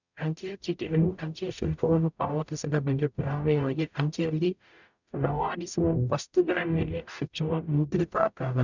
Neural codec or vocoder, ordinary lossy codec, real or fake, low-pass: codec, 44.1 kHz, 0.9 kbps, DAC; none; fake; 7.2 kHz